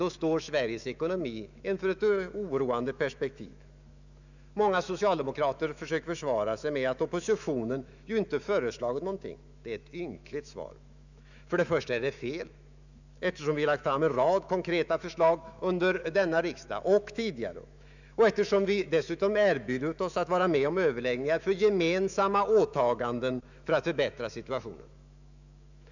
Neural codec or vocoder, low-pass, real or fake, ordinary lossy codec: autoencoder, 48 kHz, 128 numbers a frame, DAC-VAE, trained on Japanese speech; 7.2 kHz; fake; none